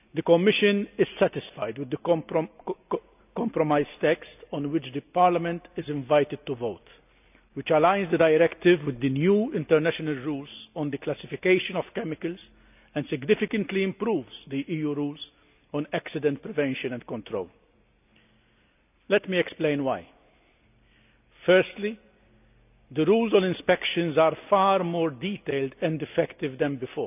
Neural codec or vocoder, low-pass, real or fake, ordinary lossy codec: none; 3.6 kHz; real; none